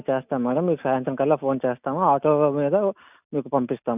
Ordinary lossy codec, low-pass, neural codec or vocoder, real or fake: none; 3.6 kHz; none; real